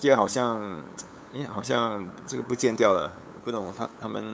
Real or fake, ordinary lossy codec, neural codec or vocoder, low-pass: fake; none; codec, 16 kHz, 8 kbps, FunCodec, trained on LibriTTS, 25 frames a second; none